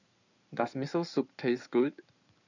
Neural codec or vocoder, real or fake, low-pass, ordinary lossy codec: codec, 16 kHz in and 24 kHz out, 2.2 kbps, FireRedTTS-2 codec; fake; 7.2 kHz; none